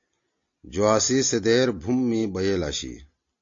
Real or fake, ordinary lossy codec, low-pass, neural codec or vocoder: real; AAC, 48 kbps; 7.2 kHz; none